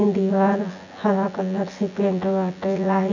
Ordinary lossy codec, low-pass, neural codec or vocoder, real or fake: none; 7.2 kHz; vocoder, 24 kHz, 100 mel bands, Vocos; fake